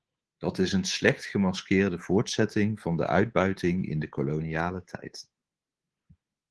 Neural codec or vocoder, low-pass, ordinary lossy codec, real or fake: codec, 24 kHz, 3.1 kbps, DualCodec; 10.8 kHz; Opus, 16 kbps; fake